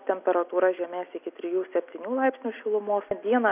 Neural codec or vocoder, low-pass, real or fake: none; 3.6 kHz; real